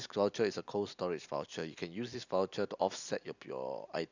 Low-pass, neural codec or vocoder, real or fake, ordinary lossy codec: 7.2 kHz; none; real; none